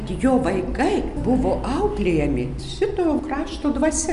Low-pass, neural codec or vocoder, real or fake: 10.8 kHz; none; real